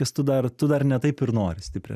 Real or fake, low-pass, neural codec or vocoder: real; 14.4 kHz; none